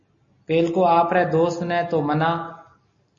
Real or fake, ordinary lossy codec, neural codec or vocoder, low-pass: real; MP3, 32 kbps; none; 7.2 kHz